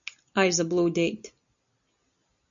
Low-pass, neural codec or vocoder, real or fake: 7.2 kHz; none; real